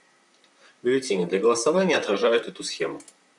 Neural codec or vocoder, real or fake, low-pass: vocoder, 44.1 kHz, 128 mel bands, Pupu-Vocoder; fake; 10.8 kHz